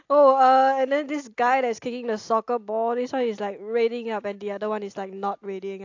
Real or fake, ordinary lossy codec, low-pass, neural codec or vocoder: real; AAC, 48 kbps; 7.2 kHz; none